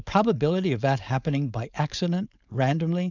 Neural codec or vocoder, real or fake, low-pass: codec, 16 kHz, 4.8 kbps, FACodec; fake; 7.2 kHz